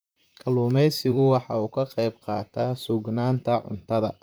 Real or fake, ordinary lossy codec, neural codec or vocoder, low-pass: fake; none; vocoder, 44.1 kHz, 128 mel bands, Pupu-Vocoder; none